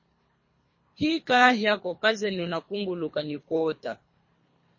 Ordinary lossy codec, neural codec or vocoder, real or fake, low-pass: MP3, 32 kbps; codec, 24 kHz, 3 kbps, HILCodec; fake; 7.2 kHz